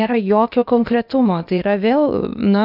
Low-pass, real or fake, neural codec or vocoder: 5.4 kHz; fake; codec, 16 kHz, 0.8 kbps, ZipCodec